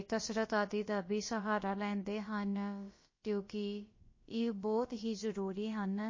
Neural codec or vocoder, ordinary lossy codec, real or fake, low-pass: codec, 16 kHz, about 1 kbps, DyCAST, with the encoder's durations; MP3, 32 kbps; fake; 7.2 kHz